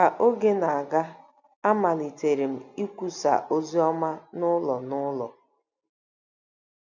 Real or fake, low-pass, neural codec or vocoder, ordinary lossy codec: real; 7.2 kHz; none; none